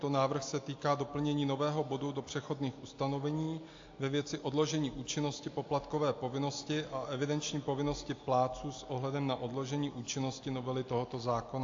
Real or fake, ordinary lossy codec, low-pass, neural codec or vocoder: real; AAC, 48 kbps; 7.2 kHz; none